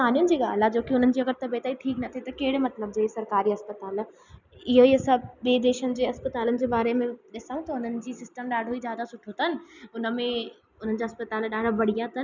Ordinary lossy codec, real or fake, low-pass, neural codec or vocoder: none; real; 7.2 kHz; none